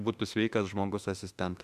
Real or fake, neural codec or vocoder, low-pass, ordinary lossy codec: fake; autoencoder, 48 kHz, 32 numbers a frame, DAC-VAE, trained on Japanese speech; 14.4 kHz; Opus, 64 kbps